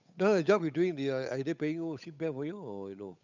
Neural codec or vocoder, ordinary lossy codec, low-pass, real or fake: codec, 16 kHz, 8 kbps, FunCodec, trained on Chinese and English, 25 frames a second; none; 7.2 kHz; fake